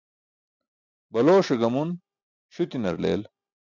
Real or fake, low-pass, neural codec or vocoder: real; 7.2 kHz; none